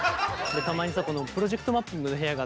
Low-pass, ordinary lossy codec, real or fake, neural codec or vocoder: none; none; real; none